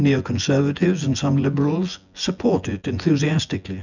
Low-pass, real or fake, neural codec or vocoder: 7.2 kHz; fake; vocoder, 24 kHz, 100 mel bands, Vocos